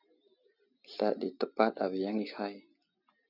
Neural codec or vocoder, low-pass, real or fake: none; 5.4 kHz; real